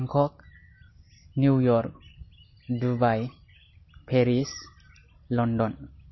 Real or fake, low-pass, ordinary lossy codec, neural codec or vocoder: real; 7.2 kHz; MP3, 24 kbps; none